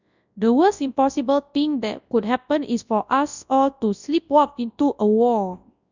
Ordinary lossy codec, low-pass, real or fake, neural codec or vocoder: none; 7.2 kHz; fake; codec, 24 kHz, 0.9 kbps, WavTokenizer, large speech release